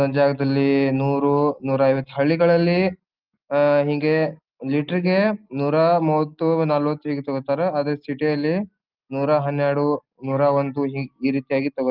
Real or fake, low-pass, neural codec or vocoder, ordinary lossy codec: real; 5.4 kHz; none; Opus, 24 kbps